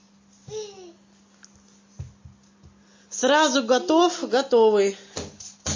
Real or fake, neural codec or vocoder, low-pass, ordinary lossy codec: real; none; 7.2 kHz; MP3, 32 kbps